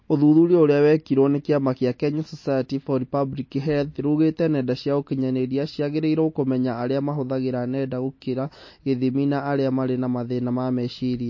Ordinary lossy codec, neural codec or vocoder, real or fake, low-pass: MP3, 32 kbps; none; real; 7.2 kHz